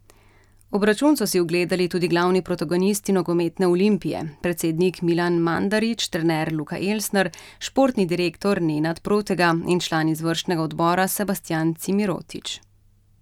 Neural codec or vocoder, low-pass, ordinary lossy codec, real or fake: none; 19.8 kHz; none; real